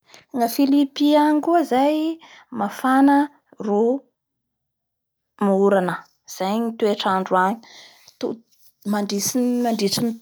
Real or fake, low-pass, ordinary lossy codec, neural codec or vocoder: real; none; none; none